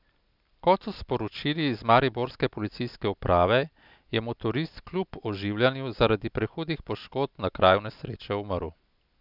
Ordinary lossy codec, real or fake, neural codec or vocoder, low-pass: none; real; none; 5.4 kHz